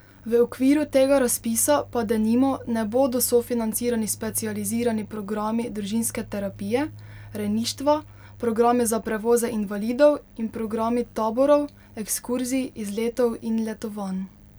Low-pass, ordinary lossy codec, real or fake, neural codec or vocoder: none; none; real; none